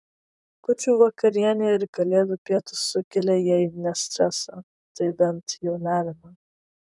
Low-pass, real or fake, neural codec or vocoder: 10.8 kHz; fake; vocoder, 44.1 kHz, 128 mel bands, Pupu-Vocoder